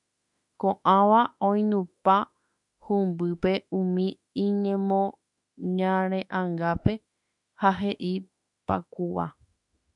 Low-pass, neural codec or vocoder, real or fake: 10.8 kHz; autoencoder, 48 kHz, 32 numbers a frame, DAC-VAE, trained on Japanese speech; fake